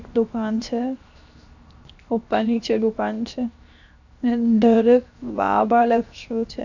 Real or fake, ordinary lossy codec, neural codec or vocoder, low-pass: fake; none; codec, 16 kHz, 0.7 kbps, FocalCodec; 7.2 kHz